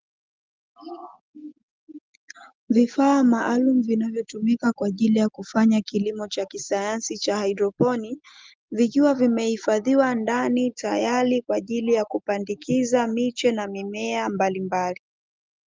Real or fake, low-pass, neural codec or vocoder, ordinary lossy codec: real; 7.2 kHz; none; Opus, 32 kbps